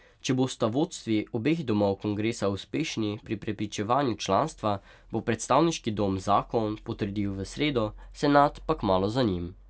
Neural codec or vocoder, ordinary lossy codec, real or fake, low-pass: none; none; real; none